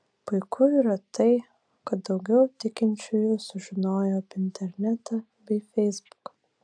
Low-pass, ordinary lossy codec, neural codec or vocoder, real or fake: 9.9 kHz; AAC, 64 kbps; none; real